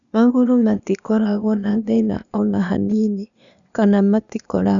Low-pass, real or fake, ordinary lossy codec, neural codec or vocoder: 7.2 kHz; fake; none; codec, 16 kHz, 0.8 kbps, ZipCodec